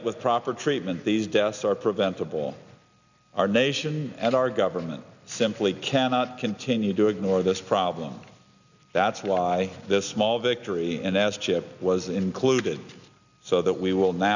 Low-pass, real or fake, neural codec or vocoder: 7.2 kHz; real; none